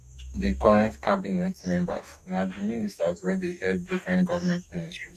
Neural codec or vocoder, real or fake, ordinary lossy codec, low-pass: codec, 44.1 kHz, 2.6 kbps, DAC; fake; none; 14.4 kHz